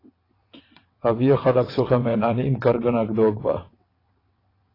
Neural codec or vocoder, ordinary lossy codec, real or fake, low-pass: vocoder, 22.05 kHz, 80 mel bands, WaveNeXt; AAC, 24 kbps; fake; 5.4 kHz